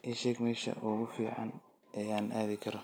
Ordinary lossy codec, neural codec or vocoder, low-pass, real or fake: none; none; none; real